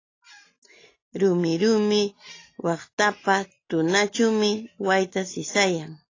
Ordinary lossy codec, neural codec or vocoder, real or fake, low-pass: AAC, 32 kbps; none; real; 7.2 kHz